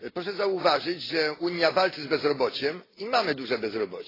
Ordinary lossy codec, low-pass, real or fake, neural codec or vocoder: AAC, 24 kbps; 5.4 kHz; real; none